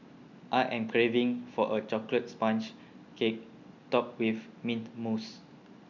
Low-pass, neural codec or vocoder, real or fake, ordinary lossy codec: 7.2 kHz; none; real; none